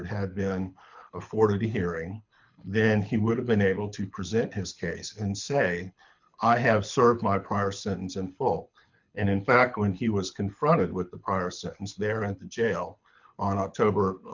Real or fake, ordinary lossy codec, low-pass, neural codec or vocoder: fake; MP3, 64 kbps; 7.2 kHz; codec, 24 kHz, 6 kbps, HILCodec